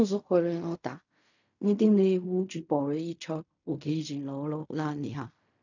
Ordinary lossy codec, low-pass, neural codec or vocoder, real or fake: none; 7.2 kHz; codec, 16 kHz in and 24 kHz out, 0.4 kbps, LongCat-Audio-Codec, fine tuned four codebook decoder; fake